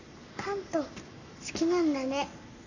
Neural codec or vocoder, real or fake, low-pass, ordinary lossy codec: codec, 44.1 kHz, 7.8 kbps, Pupu-Codec; fake; 7.2 kHz; none